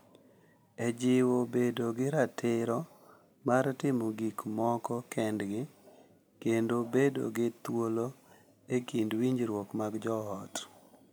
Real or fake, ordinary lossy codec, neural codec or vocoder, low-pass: real; none; none; none